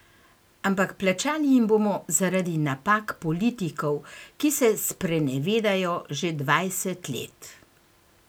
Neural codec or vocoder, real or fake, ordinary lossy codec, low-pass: none; real; none; none